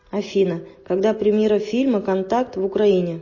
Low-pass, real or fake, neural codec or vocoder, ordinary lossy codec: 7.2 kHz; real; none; MP3, 32 kbps